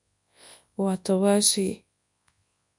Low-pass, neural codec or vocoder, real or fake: 10.8 kHz; codec, 24 kHz, 0.9 kbps, WavTokenizer, large speech release; fake